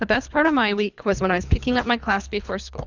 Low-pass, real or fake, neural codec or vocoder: 7.2 kHz; fake; codec, 24 kHz, 3 kbps, HILCodec